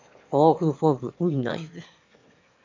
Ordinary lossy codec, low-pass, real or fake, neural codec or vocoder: MP3, 48 kbps; 7.2 kHz; fake; autoencoder, 22.05 kHz, a latent of 192 numbers a frame, VITS, trained on one speaker